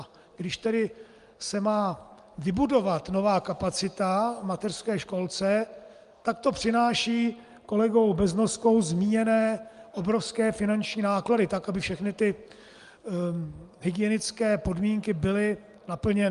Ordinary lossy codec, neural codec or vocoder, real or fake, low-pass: Opus, 32 kbps; none; real; 10.8 kHz